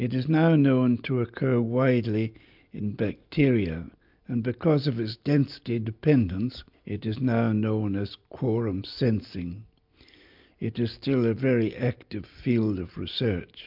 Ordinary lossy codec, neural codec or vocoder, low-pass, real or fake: MP3, 48 kbps; none; 5.4 kHz; real